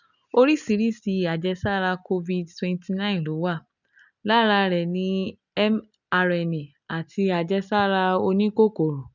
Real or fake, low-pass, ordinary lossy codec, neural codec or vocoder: real; 7.2 kHz; none; none